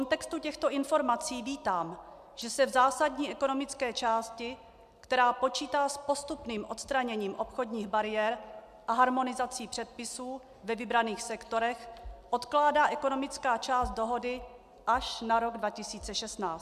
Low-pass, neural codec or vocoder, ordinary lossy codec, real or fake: 14.4 kHz; none; MP3, 96 kbps; real